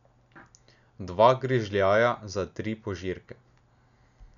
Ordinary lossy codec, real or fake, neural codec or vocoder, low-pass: none; real; none; 7.2 kHz